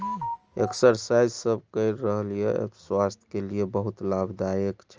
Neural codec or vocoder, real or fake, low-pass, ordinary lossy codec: none; real; 7.2 kHz; Opus, 24 kbps